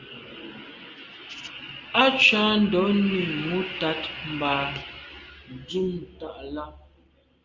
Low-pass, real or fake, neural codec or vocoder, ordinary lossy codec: 7.2 kHz; real; none; Opus, 32 kbps